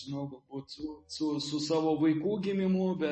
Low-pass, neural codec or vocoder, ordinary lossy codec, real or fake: 10.8 kHz; none; MP3, 32 kbps; real